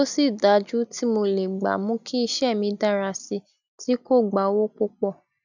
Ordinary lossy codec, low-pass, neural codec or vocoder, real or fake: none; 7.2 kHz; none; real